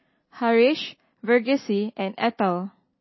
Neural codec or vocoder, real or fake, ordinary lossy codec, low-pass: none; real; MP3, 24 kbps; 7.2 kHz